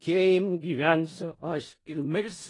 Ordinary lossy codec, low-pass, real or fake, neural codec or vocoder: AAC, 32 kbps; 10.8 kHz; fake; codec, 16 kHz in and 24 kHz out, 0.4 kbps, LongCat-Audio-Codec, four codebook decoder